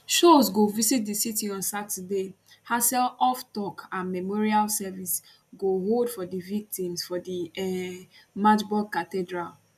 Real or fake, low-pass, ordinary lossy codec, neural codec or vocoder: real; 14.4 kHz; none; none